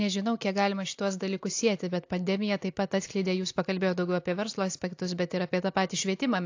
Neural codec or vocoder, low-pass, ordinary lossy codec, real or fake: none; 7.2 kHz; AAC, 48 kbps; real